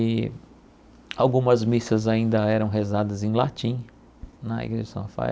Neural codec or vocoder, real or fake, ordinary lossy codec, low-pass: none; real; none; none